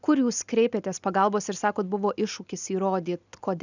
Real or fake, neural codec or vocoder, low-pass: real; none; 7.2 kHz